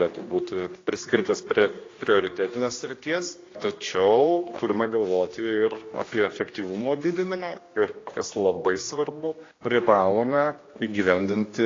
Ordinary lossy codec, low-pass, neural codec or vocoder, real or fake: AAC, 32 kbps; 7.2 kHz; codec, 16 kHz, 1 kbps, X-Codec, HuBERT features, trained on general audio; fake